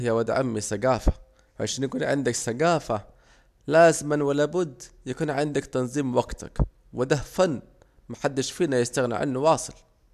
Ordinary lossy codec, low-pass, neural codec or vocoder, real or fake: none; 14.4 kHz; none; real